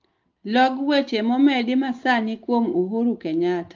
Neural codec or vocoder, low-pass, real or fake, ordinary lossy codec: none; 7.2 kHz; real; Opus, 32 kbps